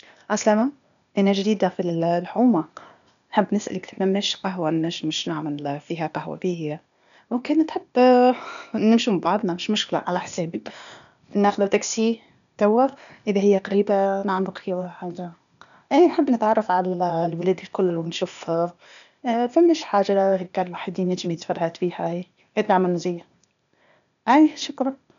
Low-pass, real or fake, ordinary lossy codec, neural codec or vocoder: 7.2 kHz; fake; none; codec, 16 kHz, 0.8 kbps, ZipCodec